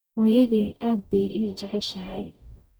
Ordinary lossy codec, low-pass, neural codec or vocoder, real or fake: none; none; codec, 44.1 kHz, 0.9 kbps, DAC; fake